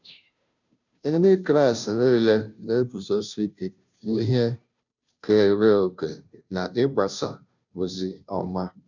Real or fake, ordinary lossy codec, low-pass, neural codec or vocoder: fake; none; 7.2 kHz; codec, 16 kHz, 0.5 kbps, FunCodec, trained on Chinese and English, 25 frames a second